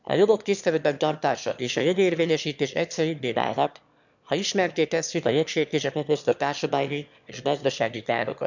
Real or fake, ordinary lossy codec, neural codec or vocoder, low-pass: fake; none; autoencoder, 22.05 kHz, a latent of 192 numbers a frame, VITS, trained on one speaker; 7.2 kHz